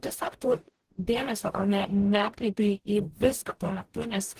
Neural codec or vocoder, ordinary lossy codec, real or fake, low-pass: codec, 44.1 kHz, 0.9 kbps, DAC; Opus, 16 kbps; fake; 14.4 kHz